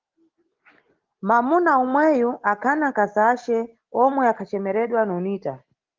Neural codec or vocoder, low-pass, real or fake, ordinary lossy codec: none; 7.2 kHz; real; Opus, 16 kbps